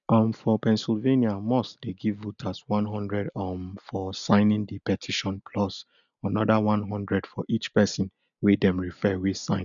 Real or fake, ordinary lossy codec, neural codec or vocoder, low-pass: real; none; none; 7.2 kHz